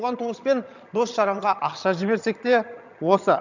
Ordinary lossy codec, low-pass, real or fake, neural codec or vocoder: none; 7.2 kHz; fake; vocoder, 22.05 kHz, 80 mel bands, HiFi-GAN